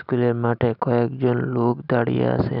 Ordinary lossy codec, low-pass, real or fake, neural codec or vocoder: none; 5.4 kHz; real; none